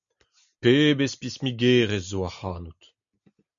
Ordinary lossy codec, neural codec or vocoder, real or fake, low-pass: MP3, 96 kbps; none; real; 7.2 kHz